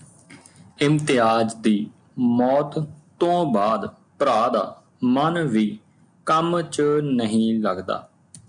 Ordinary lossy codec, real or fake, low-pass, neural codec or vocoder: MP3, 96 kbps; real; 9.9 kHz; none